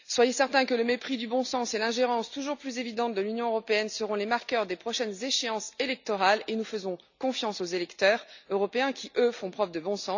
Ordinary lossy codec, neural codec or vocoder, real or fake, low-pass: none; none; real; 7.2 kHz